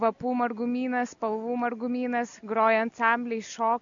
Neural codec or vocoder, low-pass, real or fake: none; 7.2 kHz; real